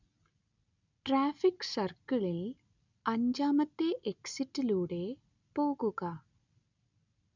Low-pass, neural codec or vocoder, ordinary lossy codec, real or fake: 7.2 kHz; none; none; real